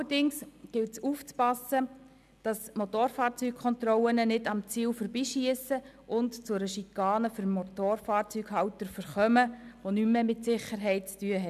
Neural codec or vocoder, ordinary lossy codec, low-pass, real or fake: none; none; 14.4 kHz; real